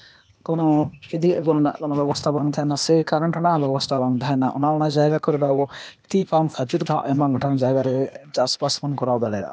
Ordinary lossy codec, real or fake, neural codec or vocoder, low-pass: none; fake; codec, 16 kHz, 0.8 kbps, ZipCodec; none